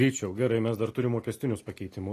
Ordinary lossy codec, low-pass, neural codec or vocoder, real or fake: AAC, 48 kbps; 14.4 kHz; none; real